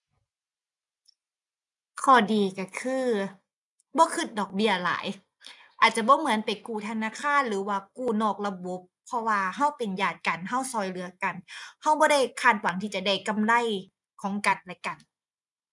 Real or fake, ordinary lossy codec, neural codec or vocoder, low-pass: real; none; none; 10.8 kHz